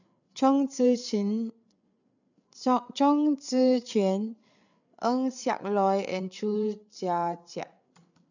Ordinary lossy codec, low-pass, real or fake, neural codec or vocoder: none; 7.2 kHz; fake; codec, 16 kHz, 8 kbps, FreqCodec, larger model